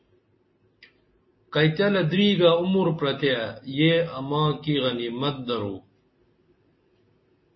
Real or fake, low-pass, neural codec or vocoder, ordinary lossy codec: real; 7.2 kHz; none; MP3, 24 kbps